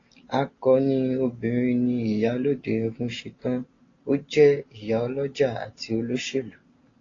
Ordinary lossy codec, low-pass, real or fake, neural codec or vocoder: AAC, 32 kbps; 7.2 kHz; real; none